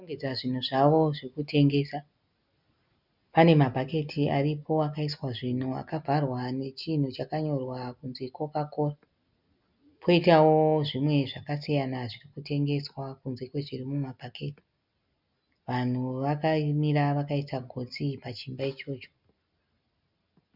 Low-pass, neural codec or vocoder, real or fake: 5.4 kHz; none; real